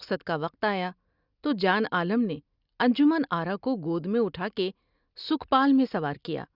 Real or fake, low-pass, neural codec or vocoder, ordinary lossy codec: real; 5.4 kHz; none; Opus, 64 kbps